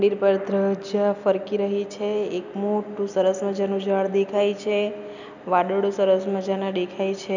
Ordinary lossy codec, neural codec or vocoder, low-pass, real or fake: none; none; 7.2 kHz; real